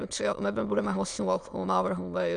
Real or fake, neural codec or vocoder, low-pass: fake; autoencoder, 22.05 kHz, a latent of 192 numbers a frame, VITS, trained on many speakers; 9.9 kHz